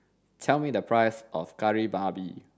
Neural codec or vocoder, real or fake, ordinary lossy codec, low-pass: none; real; none; none